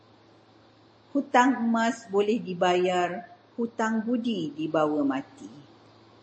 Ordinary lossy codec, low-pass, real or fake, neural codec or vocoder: MP3, 32 kbps; 9.9 kHz; real; none